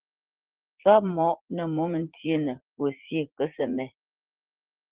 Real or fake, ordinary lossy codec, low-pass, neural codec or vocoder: fake; Opus, 16 kbps; 3.6 kHz; vocoder, 44.1 kHz, 80 mel bands, Vocos